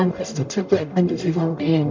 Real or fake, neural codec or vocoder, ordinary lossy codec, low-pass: fake; codec, 44.1 kHz, 0.9 kbps, DAC; MP3, 64 kbps; 7.2 kHz